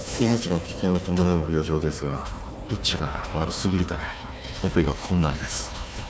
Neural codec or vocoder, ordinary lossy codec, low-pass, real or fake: codec, 16 kHz, 1 kbps, FunCodec, trained on Chinese and English, 50 frames a second; none; none; fake